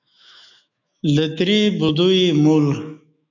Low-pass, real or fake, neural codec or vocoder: 7.2 kHz; fake; autoencoder, 48 kHz, 128 numbers a frame, DAC-VAE, trained on Japanese speech